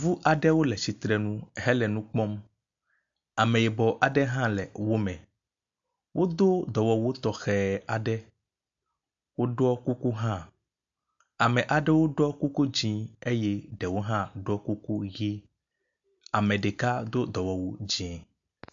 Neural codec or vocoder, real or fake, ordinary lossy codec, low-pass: none; real; AAC, 64 kbps; 7.2 kHz